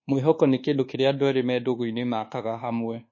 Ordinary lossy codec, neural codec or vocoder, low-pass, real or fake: MP3, 32 kbps; codec, 24 kHz, 1.2 kbps, DualCodec; 7.2 kHz; fake